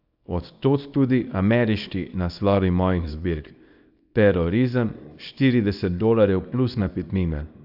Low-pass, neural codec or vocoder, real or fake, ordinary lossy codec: 5.4 kHz; codec, 24 kHz, 0.9 kbps, WavTokenizer, small release; fake; AAC, 48 kbps